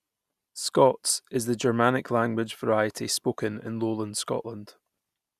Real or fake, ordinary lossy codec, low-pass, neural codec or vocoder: real; Opus, 64 kbps; 14.4 kHz; none